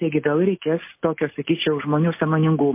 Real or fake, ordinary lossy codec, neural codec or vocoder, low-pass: real; MP3, 24 kbps; none; 3.6 kHz